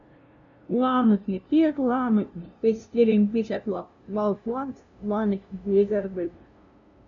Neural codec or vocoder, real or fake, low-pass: codec, 16 kHz, 0.5 kbps, FunCodec, trained on LibriTTS, 25 frames a second; fake; 7.2 kHz